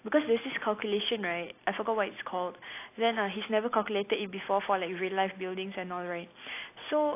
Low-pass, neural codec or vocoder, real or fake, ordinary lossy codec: 3.6 kHz; none; real; AAC, 24 kbps